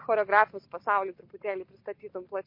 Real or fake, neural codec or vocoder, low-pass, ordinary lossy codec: real; none; 5.4 kHz; MP3, 32 kbps